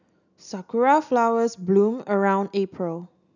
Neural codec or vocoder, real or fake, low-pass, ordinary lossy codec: none; real; 7.2 kHz; none